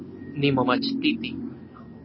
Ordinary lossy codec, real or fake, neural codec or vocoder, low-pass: MP3, 24 kbps; real; none; 7.2 kHz